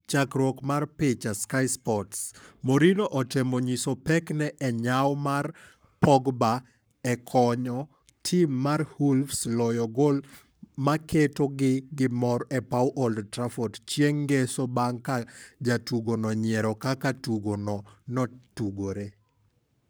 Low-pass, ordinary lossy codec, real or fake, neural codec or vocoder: none; none; fake; codec, 44.1 kHz, 7.8 kbps, Pupu-Codec